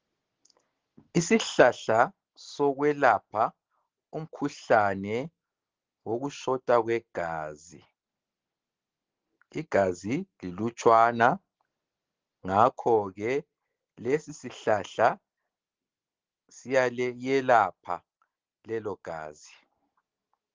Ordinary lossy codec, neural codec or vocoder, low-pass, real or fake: Opus, 16 kbps; none; 7.2 kHz; real